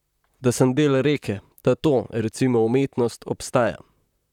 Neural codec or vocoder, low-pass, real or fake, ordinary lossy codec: vocoder, 44.1 kHz, 128 mel bands, Pupu-Vocoder; 19.8 kHz; fake; none